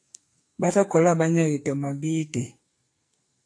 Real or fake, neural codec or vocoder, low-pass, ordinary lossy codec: fake; codec, 44.1 kHz, 2.6 kbps, SNAC; 9.9 kHz; AAC, 48 kbps